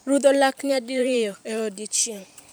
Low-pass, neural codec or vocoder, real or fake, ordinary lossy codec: none; vocoder, 44.1 kHz, 128 mel bands every 512 samples, BigVGAN v2; fake; none